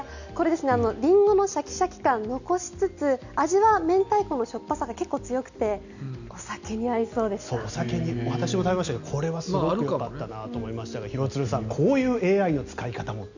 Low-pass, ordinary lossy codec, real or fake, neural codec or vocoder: 7.2 kHz; none; real; none